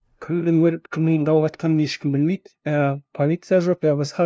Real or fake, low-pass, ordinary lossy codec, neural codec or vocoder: fake; none; none; codec, 16 kHz, 0.5 kbps, FunCodec, trained on LibriTTS, 25 frames a second